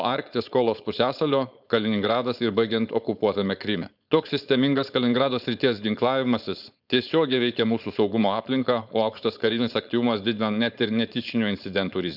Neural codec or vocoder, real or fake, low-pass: codec, 16 kHz, 4.8 kbps, FACodec; fake; 5.4 kHz